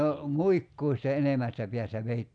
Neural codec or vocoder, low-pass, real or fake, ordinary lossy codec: none; 9.9 kHz; real; none